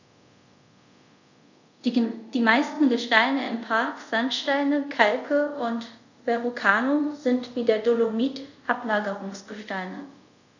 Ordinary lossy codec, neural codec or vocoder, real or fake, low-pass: none; codec, 24 kHz, 0.5 kbps, DualCodec; fake; 7.2 kHz